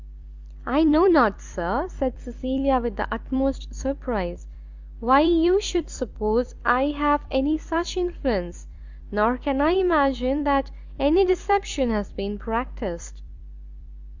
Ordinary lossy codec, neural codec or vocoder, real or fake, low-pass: Opus, 64 kbps; vocoder, 44.1 kHz, 128 mel bands every 256 samples, BigVGAN v2; fake; 7.2 kHz